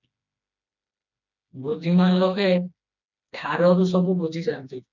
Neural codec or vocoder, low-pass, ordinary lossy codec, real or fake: codec, 16 kHz, 2 kbps, FreqCodec, smaller model; 7.2 kHz; MP3, 64 kbps; fake